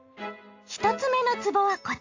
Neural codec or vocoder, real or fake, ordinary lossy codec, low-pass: none; real; AAC, 48 kbps; 7.2 kHz